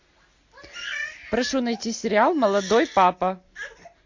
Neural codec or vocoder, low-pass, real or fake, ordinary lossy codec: none; 7.2 kHz; real; MP3, 48 kbps